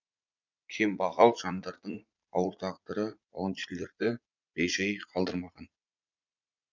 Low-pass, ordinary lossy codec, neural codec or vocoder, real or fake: 7.2 kHz; none; vocoder, 44.1 kHz, 80 mel bands, Vocos; fake